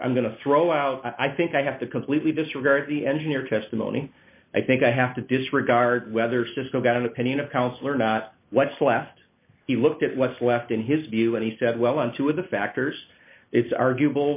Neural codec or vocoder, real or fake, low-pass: none; real; 3.6 kHz